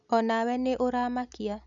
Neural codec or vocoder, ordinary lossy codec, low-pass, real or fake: none; none; 7.2 kHz; real